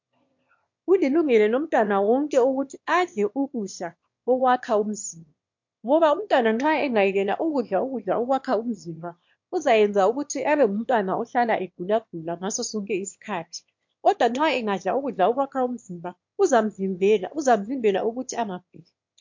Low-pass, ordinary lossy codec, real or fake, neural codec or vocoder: 7.2 kHz; MP3, 48 kbps; fake; autoencoder, 22.05 kHz, a latent of 192 numbers a frame, VITS, trained on one speaker